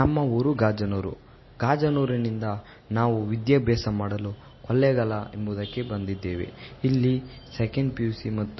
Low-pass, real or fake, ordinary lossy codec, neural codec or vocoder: 7.2 kHz; real; MP3, 24 kbps; none